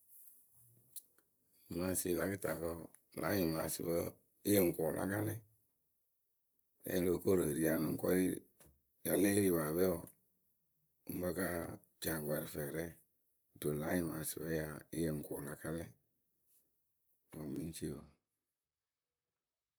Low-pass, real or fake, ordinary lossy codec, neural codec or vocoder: none; fake; none; vocoder, 44.1 kHz, 128 mel bands, Pupu-Vocoder